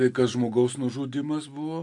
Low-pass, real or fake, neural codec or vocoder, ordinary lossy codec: 10.8 kHz; real; none; AAC, 64 kbps